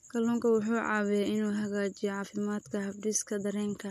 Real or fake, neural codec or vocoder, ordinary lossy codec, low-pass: real; none; MP3, 64 kbps; 14.4 kHz